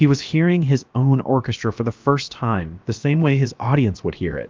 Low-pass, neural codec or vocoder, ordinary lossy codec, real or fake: 7.2 kHz; codec, 16 kHz, about 1 kbps, DyCAST, with the encoder's durations; Opus, 24 kbps; fake